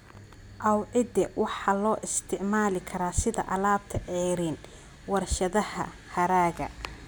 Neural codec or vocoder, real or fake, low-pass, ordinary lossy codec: none; real; none; none